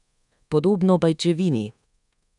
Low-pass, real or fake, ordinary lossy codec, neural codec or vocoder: 10.8 kHz; fake; none; codec, 24 kHz, 1.2 kbps, DualCodec